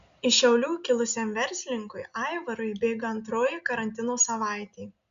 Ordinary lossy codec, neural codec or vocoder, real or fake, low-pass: Opus, 64 kbps; none; real; 7.2 kHz